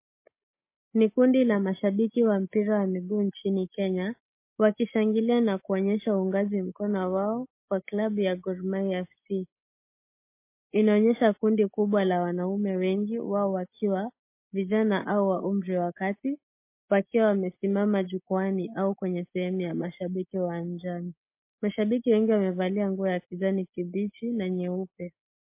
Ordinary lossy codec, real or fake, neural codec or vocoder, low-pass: MP3, 24 kbps; real; none; 3.6 kHz